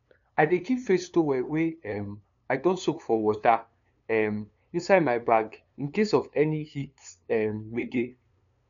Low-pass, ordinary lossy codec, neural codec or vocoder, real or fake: 7.2 kHz; none; codec, 16 kHz, 2 kbps, FunCodec, trained on LibriTTS, 25 frames a second; fake